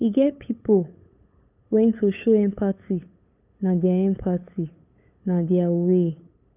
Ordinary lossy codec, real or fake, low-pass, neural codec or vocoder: none; fake; 3.6 kHz; codec, 16 kHz, 8 kbps, FunCodec, trained on LibriTTS, 25 frames a second